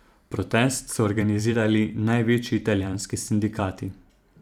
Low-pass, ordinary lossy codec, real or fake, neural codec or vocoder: 19.8 kHz; none; fake; vocoder, 44.1 kHz, 128 mel bands every 512 samples, BigVGAN v2